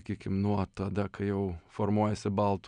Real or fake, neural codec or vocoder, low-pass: real; none; 9.9 kHz